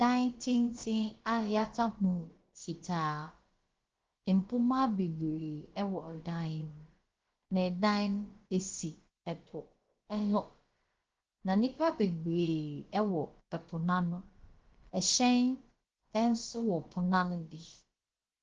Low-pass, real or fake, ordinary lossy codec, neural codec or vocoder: 7.2 kHz; fake; Opus, 16 kbps; codec, 16 kHz, about 1 kbps, DyCAST, with the encoder's durations